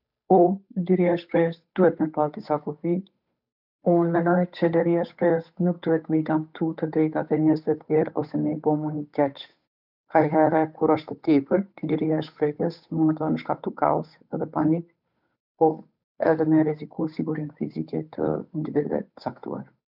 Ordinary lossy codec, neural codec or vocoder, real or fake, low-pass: none; codec, 16 kHz, 8 kbps, FunCodec, trained on Chinese and English, 25 frames a second; fake; 5.4 kHz